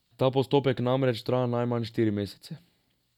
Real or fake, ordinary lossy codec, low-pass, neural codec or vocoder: real; none; 19.8 kHz; none